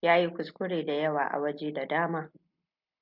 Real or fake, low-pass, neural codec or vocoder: real; 5.4 kHz; none